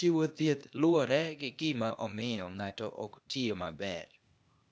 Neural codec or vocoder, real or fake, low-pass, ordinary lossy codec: codec, 16 kHz, 0.8 kbps, ZipCodec; fake; none; none